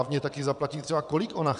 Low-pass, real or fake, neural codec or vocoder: 9.9 kHz; fake; vocoder, 22.05 kHz, 80 mel bands, WaveNeXt